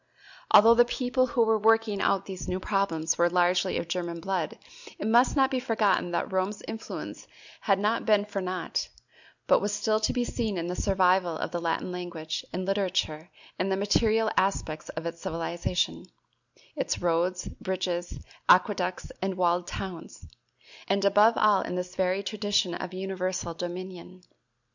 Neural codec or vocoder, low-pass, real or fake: none; 7.2 kHz; real